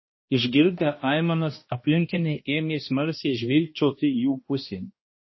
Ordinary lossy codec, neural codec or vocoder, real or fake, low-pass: MP3, 24 kbps; codec, 16 kHz, 1 kbps, X-Codec, HuBERT features, trained on balanced general audio; fake; 7.2 kHz